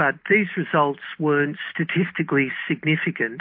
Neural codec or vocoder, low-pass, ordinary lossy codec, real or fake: none; 5.4 kHz; MP3, 32 kbps; real